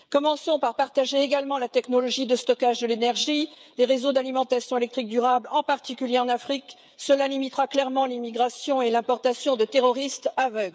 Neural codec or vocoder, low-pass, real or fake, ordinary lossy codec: codec, 16 kHz, 8 kbps, FreqCodec, smaller model; none; fake; none